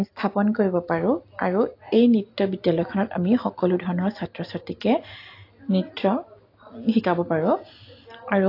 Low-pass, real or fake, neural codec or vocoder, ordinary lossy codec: 5.4 kHz; real; none; AAC, 32 kbps